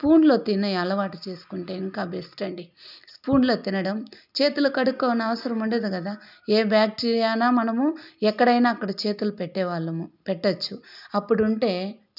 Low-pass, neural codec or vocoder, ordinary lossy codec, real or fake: 5.4 kHz; none; none; real